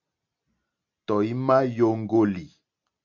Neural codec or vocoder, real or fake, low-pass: none; real; 7.2 kHz